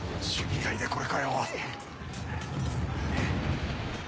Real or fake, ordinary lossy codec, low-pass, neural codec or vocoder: real; none; none; none